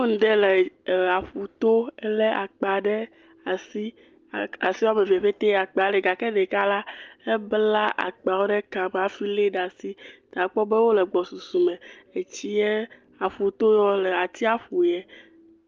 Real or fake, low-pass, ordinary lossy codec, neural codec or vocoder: real; 7.2 kHz; Opus, 24 kbps; none